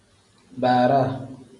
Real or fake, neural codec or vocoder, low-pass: real; none; 10.8 kHz